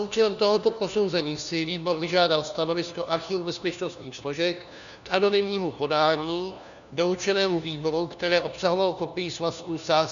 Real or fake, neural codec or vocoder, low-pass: fake; codec, 16 kHz, 1 kbps, FunCodec, trained on LibriTTS, 50 frames a second; 7.2 kHz